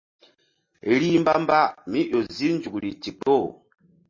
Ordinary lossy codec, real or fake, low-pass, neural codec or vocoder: MP3, 32 kbps; real; 7.2 kHz; none